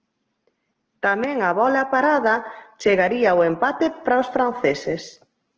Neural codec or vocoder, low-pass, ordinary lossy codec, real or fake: none; 7.2 kHz; Opus, 16 kbps; real